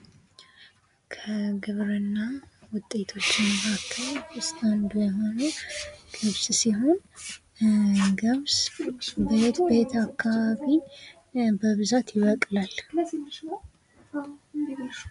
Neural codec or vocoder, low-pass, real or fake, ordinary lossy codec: none; 10.8 kHz; real; MP3, 96 kbps